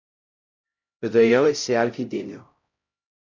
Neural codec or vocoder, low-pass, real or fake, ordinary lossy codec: codec, 16 kHz, 0.5 kbps, X-Codec, HuBERT features, trained on LibriSpeech; 7.2 kHz; fake; MP3, 48 kbps